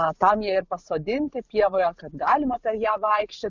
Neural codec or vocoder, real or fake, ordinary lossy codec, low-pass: vocoder, 44.1 kHz, 128 mel bands every 512 samples, BigVGAN v2; fake; Opus, 64 kbps; 7.2 kHz